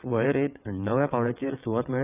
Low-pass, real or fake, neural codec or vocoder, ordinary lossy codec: 3.6 kHz; fake; vocoder, 22.05 kHz, 80 mel bands, WaveNeXt; none